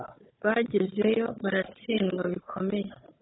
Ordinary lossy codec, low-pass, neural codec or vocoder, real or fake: AAC, 16 kbps; 7.2 kHz; codec, 16 kHz, 4 kbps, FunCodec, trained on Chinese and English, 50 frames a second; fake